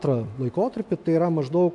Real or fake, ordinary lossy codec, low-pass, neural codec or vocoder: real; AAC, 64 kbps; 10.8 kHz; none